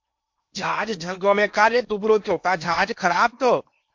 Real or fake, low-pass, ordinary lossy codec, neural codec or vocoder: fake; 7.2 kHz; MP3, 48 kbps; codec, 16 kHz in and 24 kHz out, 0.8 kbps, FocalCodec, streaming, 65536 codes